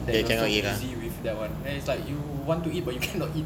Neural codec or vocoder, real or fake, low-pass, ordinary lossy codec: none; real; 19.8 kHz; none